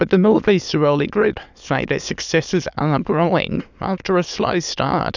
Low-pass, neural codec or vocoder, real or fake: 7.2 kHz; autoencoder, 22.05 kHz, a latent of 192 numbers a frame, VITS, trained on many speakers; fake